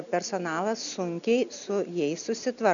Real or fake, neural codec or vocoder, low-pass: real; none; 7.2 kHz